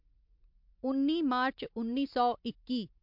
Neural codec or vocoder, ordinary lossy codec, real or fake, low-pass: none; MP3, 48 kbps; real; 5.4 kHz